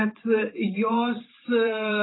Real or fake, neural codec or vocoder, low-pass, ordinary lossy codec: fake; vocoder, 44.1 kHz, 128 mel bands every 512 samples, BigVGAN v2; 7.2 kHz; AAC, 16 kbps